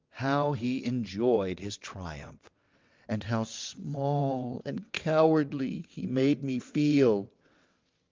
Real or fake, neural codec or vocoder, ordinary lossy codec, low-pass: fake; vocoder, 22.05 kHz, 80 mel bands, WaveNeXt; Opus, 32 kbps; 7.2 kHz